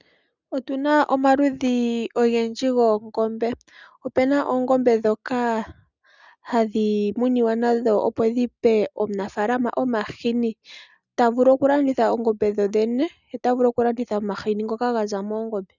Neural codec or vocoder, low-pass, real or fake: none; 7.2 kHz; real